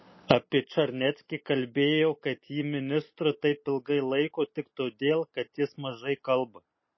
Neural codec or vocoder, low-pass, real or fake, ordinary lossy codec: none; 7.2 kHz; real; MP3, 24 kbps